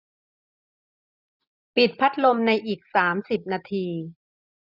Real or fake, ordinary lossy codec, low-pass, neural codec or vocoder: real; none; 5.4 kHz; none